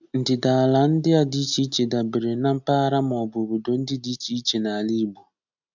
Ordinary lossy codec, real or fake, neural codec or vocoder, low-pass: none; real; none; 7.2 kHz